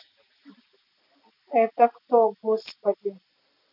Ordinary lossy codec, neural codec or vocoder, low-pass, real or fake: none; none; 5.4 kHz; real